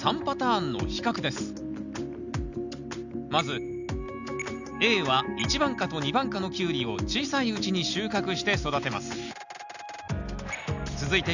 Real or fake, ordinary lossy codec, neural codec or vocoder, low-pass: real; none; none; 7.2 kHz